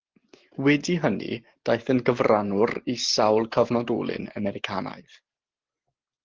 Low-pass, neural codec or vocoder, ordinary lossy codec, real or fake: 7.2 kHz; none; Opus, 16 kbps; real